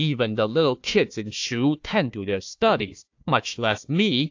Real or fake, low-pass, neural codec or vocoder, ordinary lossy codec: fake; 7.2 kHz; codec, 16 kHz, 1 kbps, FunCodec, trained on Chinese and English, 50 frames a second; AAC, 48 kbps